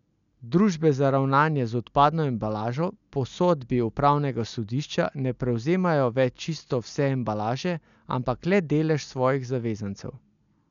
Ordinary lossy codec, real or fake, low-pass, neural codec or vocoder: none; real; 7.2 kHz; none